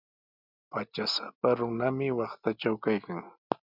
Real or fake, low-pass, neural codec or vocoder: real; 5.4 kHz; none